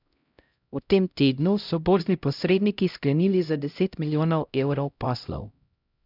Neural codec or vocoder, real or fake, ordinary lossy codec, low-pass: codec, 16 kHz, 0.5 kbps, X-Codec, HuBERT features, trained on LibriSpeech; fake; none; 5.4 kHz